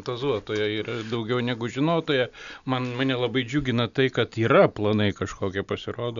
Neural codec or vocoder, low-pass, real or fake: none; 7.2 kHz; real